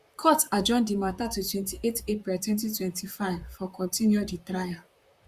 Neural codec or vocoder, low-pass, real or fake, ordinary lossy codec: vocoder, 44.1 kHz, 128 mel bands, Pupu-Vocoder; 14.4 kHz; fake; Opus, 64 kbps